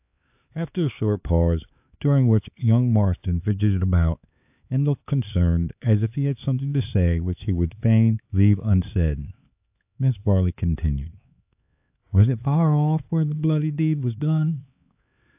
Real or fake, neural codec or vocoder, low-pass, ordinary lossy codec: fake; codec, 16 kHz, 4 kbps, X-Codec, HuBERT features, trained on LibriSpeech; 3.6 kHz; AAC, 32 kbps